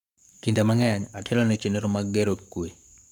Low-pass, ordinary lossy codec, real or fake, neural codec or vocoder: 19.8 kHz; none; fake; codec, 44.1 kHz, 7.8 kbps, Pupu-Codec